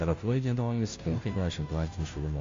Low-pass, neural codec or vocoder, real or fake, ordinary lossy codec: 7.2 kHz; codec, 16 kHz, 0.5 kbps, FunCodec, trained on Chinese and English, 25 frames a second; fake; MP3, 48 kbps